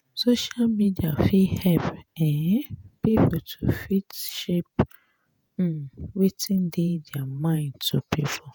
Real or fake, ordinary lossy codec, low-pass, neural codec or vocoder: fake; none; none; vocoder, 48 kHz, 128 mel bands, Vocos